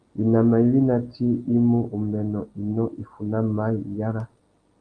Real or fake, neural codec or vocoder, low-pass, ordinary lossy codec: real; none; 9.9 kHz; Opus, 24 kbps